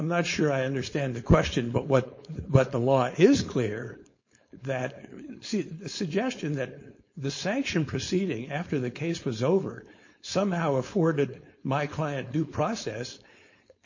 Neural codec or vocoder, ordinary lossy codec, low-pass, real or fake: codec, 16 kHz, 4.8 kbps, FACodec; MP3, 32 kbps; 7.2 kHz; fake